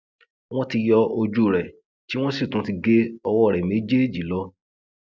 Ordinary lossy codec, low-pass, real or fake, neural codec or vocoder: none; none; real; none